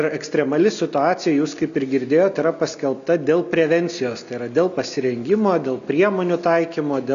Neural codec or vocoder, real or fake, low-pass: none; real; 7.2 kHz